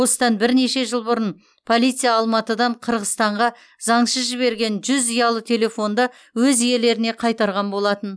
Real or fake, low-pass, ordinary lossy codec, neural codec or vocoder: real; none; none; none